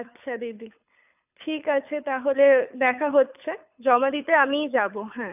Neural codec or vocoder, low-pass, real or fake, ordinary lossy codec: codec, 24 kHz, 6 kbps, HILCodec; 3.6 kHz; fake; none